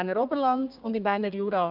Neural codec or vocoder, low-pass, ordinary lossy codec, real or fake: codec, 32 kHz, 1.9 kbps, SNAC; 5.4 kHz; none; fake